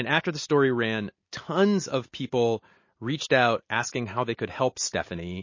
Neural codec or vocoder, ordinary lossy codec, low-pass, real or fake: none; MP3, 32 kbps; 7.2 kHz; real